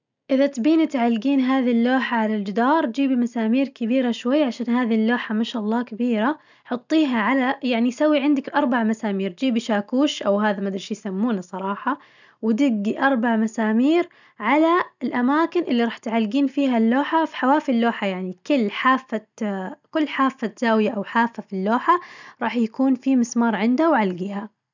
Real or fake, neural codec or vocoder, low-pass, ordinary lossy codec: real; none; 7.2 kHz; none